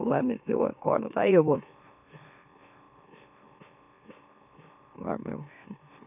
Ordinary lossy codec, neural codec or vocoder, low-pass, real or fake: none; autoencoder, 44.1 kHz, a latent of 192 numbers a frame, MeloTTS; 3.6 kHz; fake